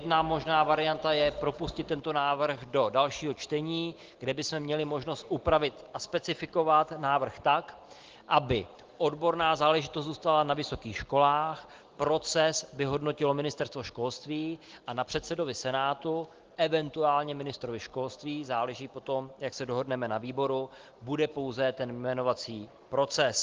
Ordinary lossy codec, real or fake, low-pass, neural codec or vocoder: Opus, 16 kbps; real; 7.2 kHz; none